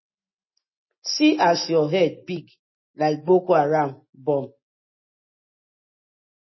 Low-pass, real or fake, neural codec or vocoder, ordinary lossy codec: 7.2 kHz; real; none; MP3, 24 kbps